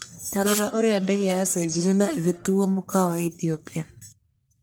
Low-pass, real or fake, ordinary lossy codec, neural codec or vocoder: none; fake; none; codec, 44.1 kHz, 1.7 kbps, Pupu-Codec